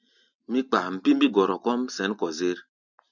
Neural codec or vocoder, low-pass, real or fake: none; 7.2 kHz; real